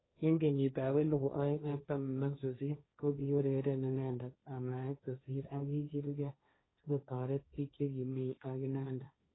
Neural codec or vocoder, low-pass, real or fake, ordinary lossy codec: codec, 16 kHz, 1.1 kbps, Voila-Tokenizer; 7.2 kHz; fake; AAC, 16 kbps